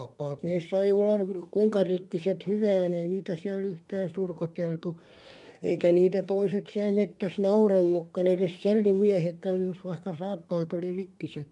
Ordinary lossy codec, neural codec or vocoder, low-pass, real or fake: MP3, 96 kbps; codec, 24 kHz, 1 kbps, SNAC; 10.8 kHz; fake